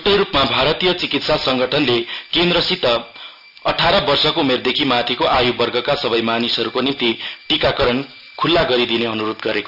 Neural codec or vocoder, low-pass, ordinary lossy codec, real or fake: none; 5.4 kHz; none; real